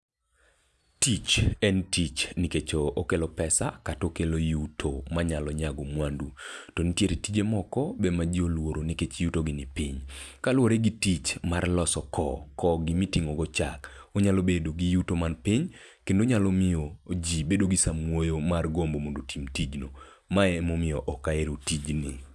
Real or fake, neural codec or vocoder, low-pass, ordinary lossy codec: real; none; none; none